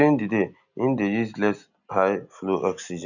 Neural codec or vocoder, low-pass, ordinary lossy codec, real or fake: none; 7.2 kHz; none; real